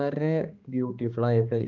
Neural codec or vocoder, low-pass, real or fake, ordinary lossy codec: codec, 16 kHz, 2 kbps, X-Codec, HuBERT features, trained on balanced general audio; 7.2 kHz; fake; Opus, 32 kbps